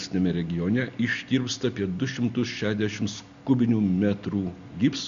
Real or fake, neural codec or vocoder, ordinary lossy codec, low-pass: real; none; Opus, 64 kbps; 7.2 kHz